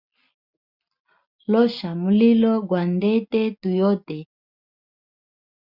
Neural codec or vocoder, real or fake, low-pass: none; real; 5.4 kHz